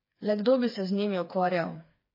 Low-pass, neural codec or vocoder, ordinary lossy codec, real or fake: 5.4 kHz; codec, 16 kHz, 4 kbps, FreqCodec, smaller model; MP3, 24 kbps; fake